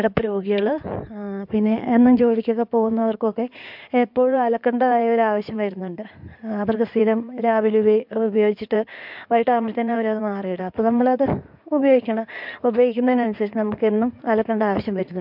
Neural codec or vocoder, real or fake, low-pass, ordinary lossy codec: codec, 16 kHz in and 24 kHz out, 2.2 kbps, FireRedTTS-2 codec; fake; 5.4 kHz; MP3, 48 kbps